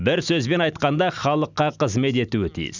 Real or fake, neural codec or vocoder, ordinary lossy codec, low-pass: real; none; none; 7.2 kHz